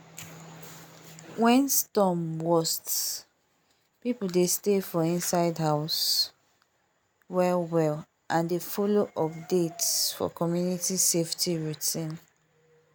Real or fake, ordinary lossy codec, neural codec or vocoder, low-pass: real; none; none; none